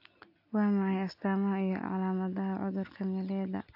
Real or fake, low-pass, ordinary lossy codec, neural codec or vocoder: real; 5.4 kHz; AAC, 32 kbps; none